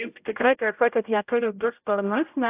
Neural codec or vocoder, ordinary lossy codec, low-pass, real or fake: codec, 16 kHz, 0.5 kbps, X-Codec, HuBERT features, trained on general audio; AAC, 24 kbps; 3.6 kHz; fake